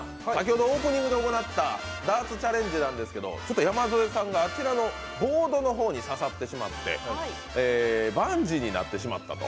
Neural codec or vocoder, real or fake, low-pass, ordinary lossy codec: none; real; none; none